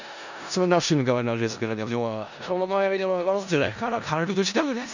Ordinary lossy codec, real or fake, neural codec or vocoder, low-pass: none; fake; codec, 16 kHz in and 24 kHz out, 0.4 kbps, LongCat-Audio-Codec, four codebook decoder; 7.2 kHz